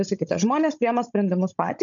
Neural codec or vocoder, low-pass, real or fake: codec, 16 kHz, 16 kbps, FunCodec, trained on Chinese and English, 50 frames a second; 7.2 kHz; fake